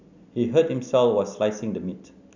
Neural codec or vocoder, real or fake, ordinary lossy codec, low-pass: none; real; none; 7.2 kHz